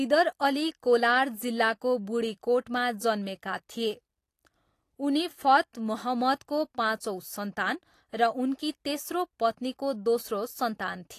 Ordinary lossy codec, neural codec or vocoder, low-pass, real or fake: AAC, 48 kbps; none; 14.4 kHz; real